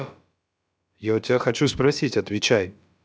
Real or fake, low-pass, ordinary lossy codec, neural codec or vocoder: fake; none; none; codec, 16 kHz, about 1 kbps, DyCAST, with the encoder's durations